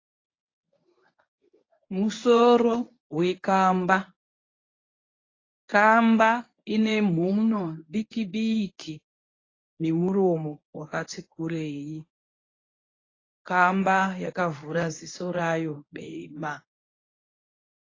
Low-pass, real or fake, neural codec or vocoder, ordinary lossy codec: 7.2 kHz; fake; codec, 24 kHz, 0.9 kbps, WavTokenizer, medium speech release version 1; AAC, 32 kbps